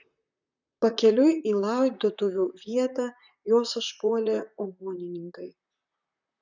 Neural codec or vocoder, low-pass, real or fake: vocoder, 44.1 kHz, 128 mel bands, Pupu-Vocoder; 7.2 kHz; fake